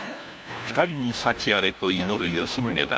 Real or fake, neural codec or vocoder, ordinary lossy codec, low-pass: fake; codec, 16 kHz, 1 kbps, FunCodec, trained on LibriTTS, 50 frames a second; none; none